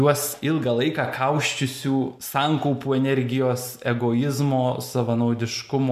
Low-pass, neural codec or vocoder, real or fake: 14.4 kHz; none; real